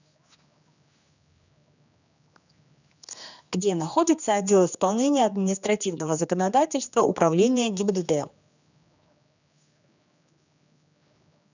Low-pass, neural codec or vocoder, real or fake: 7.2 kHz; codec, 16 kHz, 2 kbps, X-Codec, HuBERT features, trained on general audio; fake